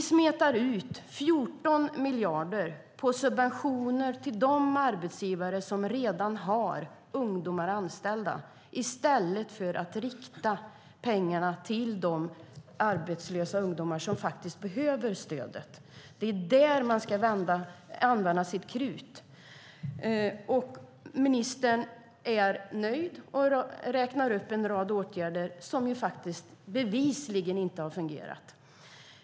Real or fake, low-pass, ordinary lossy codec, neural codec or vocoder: real; none; none; none